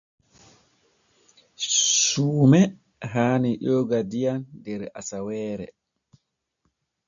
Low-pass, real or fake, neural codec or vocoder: 7.2 kHz; real; none